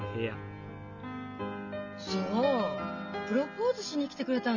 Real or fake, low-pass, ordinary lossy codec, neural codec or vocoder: real; 7.2 kHz; none; none